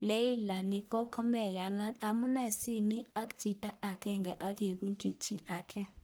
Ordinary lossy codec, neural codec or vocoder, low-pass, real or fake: none; codec, 44.1 kHz, 1.7 kbps, Pupu-Codec; none; fake